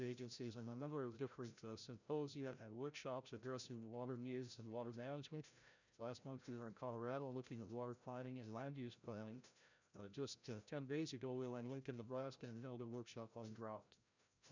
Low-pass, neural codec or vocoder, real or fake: 7.2 kHz; codec, 16 kHz, 0.5 kbps, FreqCodec, larger model; fake